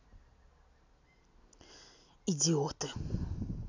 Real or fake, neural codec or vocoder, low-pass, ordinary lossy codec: real; none; 7.2 kHz; none